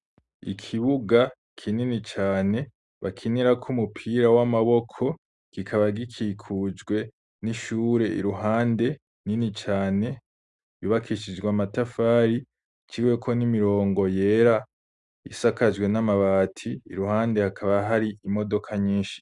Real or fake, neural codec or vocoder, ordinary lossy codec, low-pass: real; none; Opus, 64 kbps; 10.8 kHz